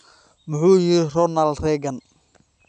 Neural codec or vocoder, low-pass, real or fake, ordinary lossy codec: none; 9.9 kHz; real; none